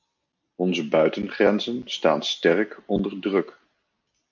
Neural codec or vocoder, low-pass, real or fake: none; 7.2 kHz; real